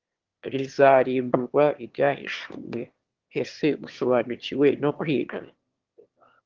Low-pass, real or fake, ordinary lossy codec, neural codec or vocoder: 7.2 kHz; fake; Opus, 16 kbps; autoencoder, 22.05 kHz, a latent of 192 numbers a frame, VITS, trained on one speaker